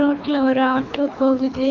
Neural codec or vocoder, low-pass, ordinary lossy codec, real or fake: codec, 24 kHz, 3 kbps, HILCodec; 7.2 kHz; none; fake